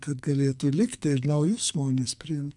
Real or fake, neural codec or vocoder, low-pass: fake; codec, 44.1 kHz, 2.6 kbps, SNAC; 10.8 kHz